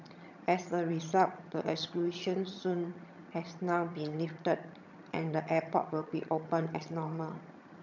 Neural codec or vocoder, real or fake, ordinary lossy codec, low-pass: vocoder, 22.05 kHz, 80 mel bands, HiFi-GAN; fake; none; 7.2 kHz